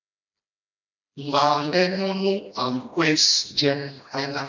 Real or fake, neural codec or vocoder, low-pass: fake; codec, 16 kHz, 1 kbps, FreqCodec, smaller model; 7.2 kHz